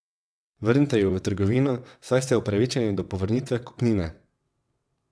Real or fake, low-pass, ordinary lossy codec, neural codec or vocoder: fake; none; none; vocoder, 22.05 kHz, 80 mel bands, WaveNeXt